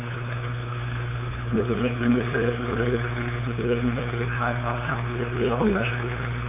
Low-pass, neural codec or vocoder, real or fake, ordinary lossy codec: 3.6 kHz; codec, 16 kHz, 2 kbps, FunCodec, trained on LibriTTS, 25 frames a second; fake; none